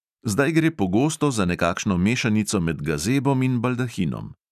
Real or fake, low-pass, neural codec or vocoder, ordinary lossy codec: real; 14.4 kHz; none; none